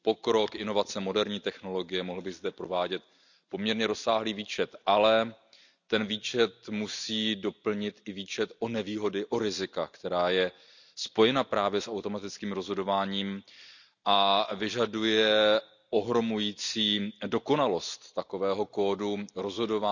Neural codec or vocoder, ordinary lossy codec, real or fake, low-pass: none; none; real; 7.2 kHz